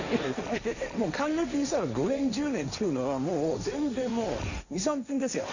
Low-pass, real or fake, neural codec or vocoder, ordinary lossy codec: 7.2 kHz; fake; codec, 16 kHz, 1.1 kbps, Voila-Tokenizer; none